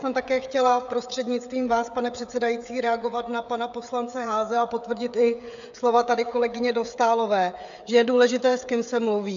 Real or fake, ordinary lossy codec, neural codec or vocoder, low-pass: fake; AAC, 64 kbps; codec, 16 kHz, 16 kbps, FreqCodec, smaller model; 7.2 kHz